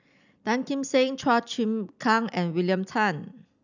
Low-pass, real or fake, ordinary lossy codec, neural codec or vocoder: 7.2 kHz; real; none; none